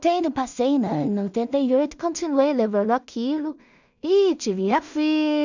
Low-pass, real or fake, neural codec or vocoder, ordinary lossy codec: 7.2 kHz; fake; codec, 16 kHz in and 24 kHz out, 0.4 kbps, LongCat-Audio-Codec, two codebook decoder; none